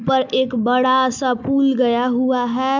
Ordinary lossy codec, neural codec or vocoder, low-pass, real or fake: none; none; 7.2 kHz; real